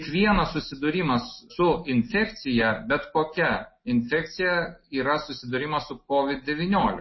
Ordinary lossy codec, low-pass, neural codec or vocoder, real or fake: MP3, 24 kbps; 7.2 kHz; none; real